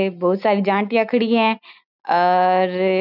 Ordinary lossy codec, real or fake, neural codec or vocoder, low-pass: none; real; none; 5.4 kHz